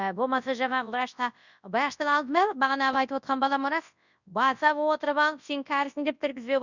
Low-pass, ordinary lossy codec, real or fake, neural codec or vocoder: 7.2 kHz; none; fake; codec, 24 kHz, 0.9 kbps, WavTokenizer, large speech release